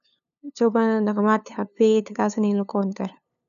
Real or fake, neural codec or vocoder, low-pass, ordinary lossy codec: fake; codec, 16 kHz, 2 kbps, FunCodec, trained on LibriTTS, 25 frames a second; 7.2 kHz; none